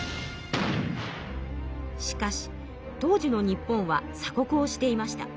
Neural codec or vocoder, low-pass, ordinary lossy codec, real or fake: none; none; none; real